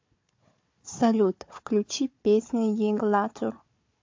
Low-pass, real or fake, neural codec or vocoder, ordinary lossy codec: 7.2 kHz; fake; codec, 16 kHz, 4 kbps, FunCodec, trained on Chinese and English, 50 frames a second; MP3, 48 kbps